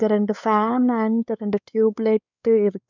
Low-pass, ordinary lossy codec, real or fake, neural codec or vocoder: 7.2 kHz; none; fake; codec, 16 kHz, 4 kbps, X-Codec, WavLM features, trained on Multilingual LibriSpeech